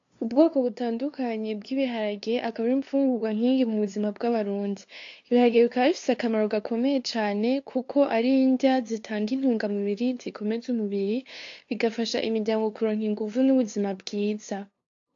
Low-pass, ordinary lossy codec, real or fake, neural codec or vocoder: 7.2 kHz; AAC, 48 kbps; fake; codec, 16 kHz, 2 kbps, FunCodec, trained on LibriTTS, 25 frames a second